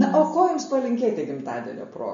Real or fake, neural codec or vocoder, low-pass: real; none; 7.2 kHz